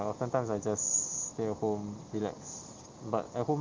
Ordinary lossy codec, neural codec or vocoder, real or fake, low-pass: Opus, 32 kbps; none; real; 7.2 kHz